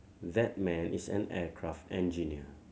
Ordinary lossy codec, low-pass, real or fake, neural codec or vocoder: none; none; real; none